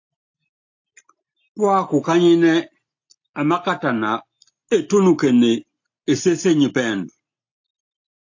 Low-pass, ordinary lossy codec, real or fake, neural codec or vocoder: 7.2 kHz; AAC, 48 kbps; real; none